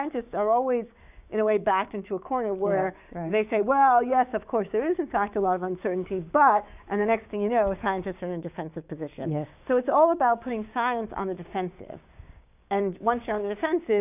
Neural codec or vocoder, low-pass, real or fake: codec, 16 kHz, 6 kbps, DAC; 3.6 kHz; fake